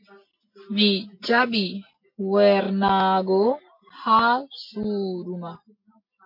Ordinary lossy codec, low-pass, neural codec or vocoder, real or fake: MP3, 32 kbps; 5.4 kHz; none; real